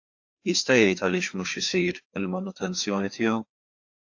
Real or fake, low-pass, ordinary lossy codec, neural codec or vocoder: fake; 7.2 kHz; AAC, 48 kbps; codec, 16 kHz, 2 kbps, FreqCodec, larger model